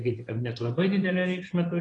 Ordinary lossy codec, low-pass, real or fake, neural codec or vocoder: AAC, 32 kbps; 10.8 kHz; fake; vocoder, 24 kHz, 100 mel bands, Vocos